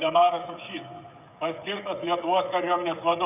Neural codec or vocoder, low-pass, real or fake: codec, 16 kHz, 16 kbps, FreqCodec, smaller model; 3.6 kHz; fake